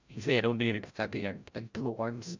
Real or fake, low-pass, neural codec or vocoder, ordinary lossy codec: fake; 7.2 kHz; codec, 16 kHz, 0.5 kbps, FreqCodec, larger model; MP3, 64 kbps